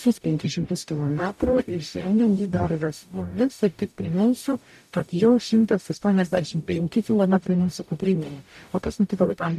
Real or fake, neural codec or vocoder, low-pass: fake; codec, 44.1 kHz, 0.9 kbps, DAC; 14.4 kHz